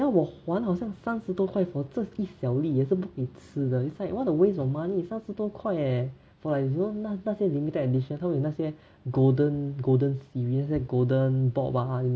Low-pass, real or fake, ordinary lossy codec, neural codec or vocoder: none; real; none; none